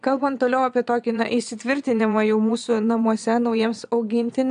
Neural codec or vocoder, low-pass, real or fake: vocoder, 22.05 kHz, 80 mel bands, Vocos; 9.9 kHz; fake